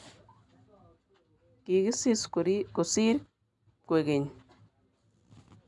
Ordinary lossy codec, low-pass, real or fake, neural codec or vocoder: none; 10.8 kHz; real; none